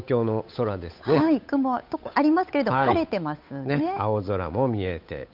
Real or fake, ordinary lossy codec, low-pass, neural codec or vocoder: fake; none; 5.4 kHz; codec, 16 kHz, 8 kbps, FunCodec, trained on Chinese and English, 25 frames a second